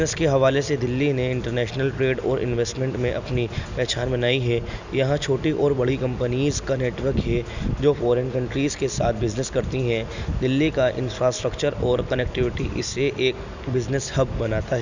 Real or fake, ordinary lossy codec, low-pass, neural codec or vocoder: real; none; 7.2 kHz; none